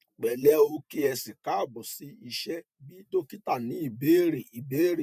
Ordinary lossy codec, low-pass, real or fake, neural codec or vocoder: none; 14.4 kHz; fake; vocoder, 48 kHz, 128 mel bands, Vocos